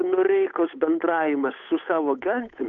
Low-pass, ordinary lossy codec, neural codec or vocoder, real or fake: 7.2 kHz; MP3, 64 kbps; codec, 16 kHz, 8 kbps, FunCodec, trained on Chinese and English, 25 frames a second; fake